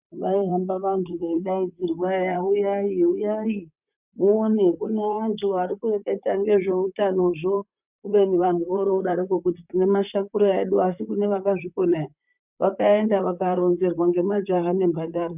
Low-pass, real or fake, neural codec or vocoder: 3.6 kHz; fake; vocoder, 44.1 kHz, 128 mel bands, Pupu-Vocoder